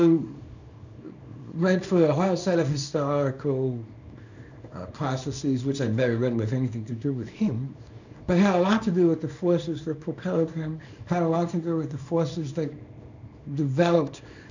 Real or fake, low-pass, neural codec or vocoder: fake; 7.2 kHz; codec, 24 kHz, 0.9 kbps, WavTokenizer, small release